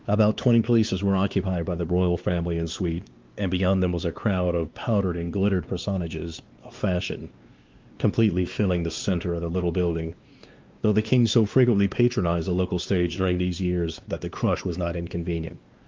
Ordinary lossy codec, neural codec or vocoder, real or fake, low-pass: Opus, 32 kbps; codec, 16 kHz, 2 kbps, X-Codec, WavLM features, trained on Multilingual LibriSpeech; fake; 7.2 kHz